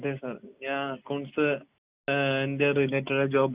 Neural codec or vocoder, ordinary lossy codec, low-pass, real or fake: none; Opus, 64 kbps; 3.6 kHz; real